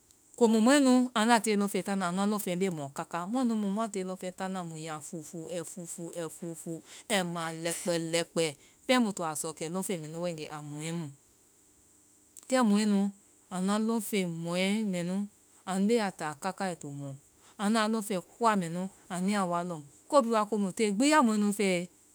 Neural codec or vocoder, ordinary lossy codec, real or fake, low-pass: autoencoder, 48 kHz, 32 numbers a frame, DAC-VAE, trained on Japanese speech; none; fake; none